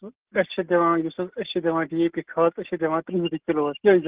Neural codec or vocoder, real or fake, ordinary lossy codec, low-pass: none; real; Opus, 16 kbps; 3.6 kHz